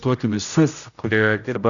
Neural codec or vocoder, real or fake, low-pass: codec, 16 kHz, 0.5 kbps, X-Codec, HuBERT features, trained on general audio; fake; 7.2 kHz